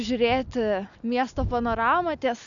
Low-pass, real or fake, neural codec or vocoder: 7.2 kHz; real; none